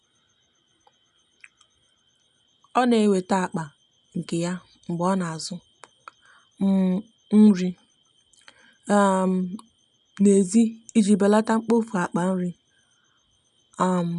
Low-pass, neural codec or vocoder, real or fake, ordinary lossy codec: 10.8 kHz; none; real; none